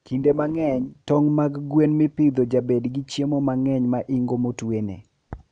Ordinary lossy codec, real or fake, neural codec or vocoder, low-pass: none; real; none; 9.9 kHz